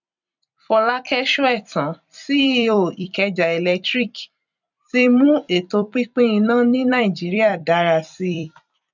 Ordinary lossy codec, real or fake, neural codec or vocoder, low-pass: none; fake; vocoder, 44.1 kHz, 128 mel bands every 256 samples, BigVGAN v2; 7.2 kHz